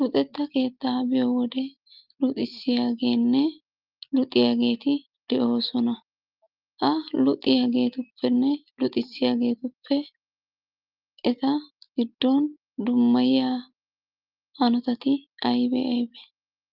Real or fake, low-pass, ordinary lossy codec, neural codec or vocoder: real; 5.4 kHz; Opus, 32 kbps; none